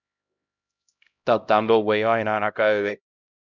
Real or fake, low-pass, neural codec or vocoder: fake; 7.2 kHz; codec, 16 kHz, 0.5 kbps, X-Codec, HuBERT features, trained on LibriSpeech